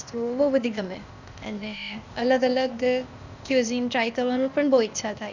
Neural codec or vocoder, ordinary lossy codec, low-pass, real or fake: codec, 16 kHz, 0.8 kbps, ZipCodec; none; 7.2 kHz; fake